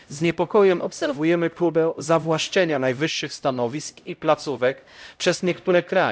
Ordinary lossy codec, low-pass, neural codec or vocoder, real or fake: none; none; codec, 16 kHz, 0.5 kbps, X-Codec, HuBERT features, trained on LibriSpeech; fake